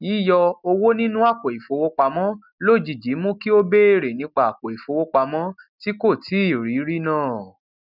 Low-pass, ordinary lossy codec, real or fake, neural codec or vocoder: 5.4 kHz; none; real; none